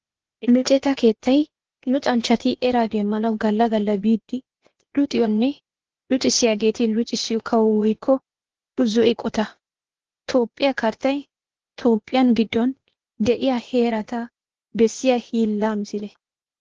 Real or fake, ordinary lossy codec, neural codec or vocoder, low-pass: fake; Opus, 16 kbps; codec, 16 kHz, 0.8 kbps, ZipCodec; 7.2 kHz